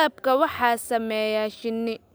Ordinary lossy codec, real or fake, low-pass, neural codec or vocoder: none; real; none; none